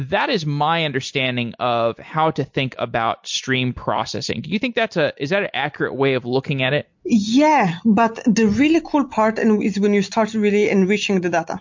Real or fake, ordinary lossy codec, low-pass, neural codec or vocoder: real; MP3, 48 kbps; 7.2 kHz; none